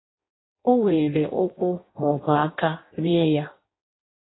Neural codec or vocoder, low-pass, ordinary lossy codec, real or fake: codec, 16 kHz in and 24 kHz out, 0.6 kbps, FireRedTTS-2 codec; 7.2 kHz; AAC, 16 kbps; fake